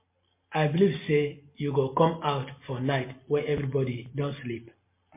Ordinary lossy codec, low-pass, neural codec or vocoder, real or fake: MP3, 24 kbps; 3.6 kHz; none; real